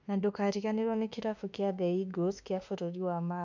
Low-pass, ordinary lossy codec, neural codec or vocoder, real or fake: 7.2 kHz; none; autoencoder, 48 kHz, 32 numbers a frame, DAC-VAE, trained on Japanese speech; fake